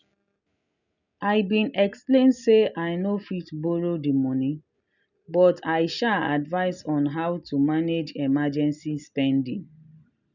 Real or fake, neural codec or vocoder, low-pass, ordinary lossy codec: real; none; 7.2 kHz; none